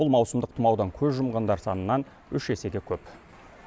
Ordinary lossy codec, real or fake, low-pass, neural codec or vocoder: none; real; none; none